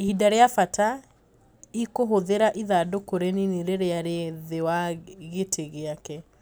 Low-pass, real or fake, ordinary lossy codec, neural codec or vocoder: none; real; none; none